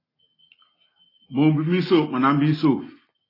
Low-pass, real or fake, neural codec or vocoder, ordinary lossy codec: 5.4 kHz; real; none; AAC, 24 kbps